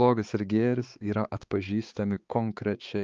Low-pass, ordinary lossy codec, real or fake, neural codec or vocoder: 7.2 kHz; Opus, 32 kbps; fake; codec, 16 kHz, 4 kbps, X-Codec, HuBERT features, trained on balanced general audio